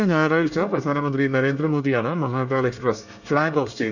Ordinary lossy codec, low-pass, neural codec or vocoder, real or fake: none; 7.2 kHz; codec, 24 kHz, 1 kbps, SNAC; fake